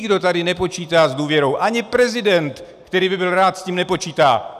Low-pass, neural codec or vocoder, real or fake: 14.4 kHz; none; real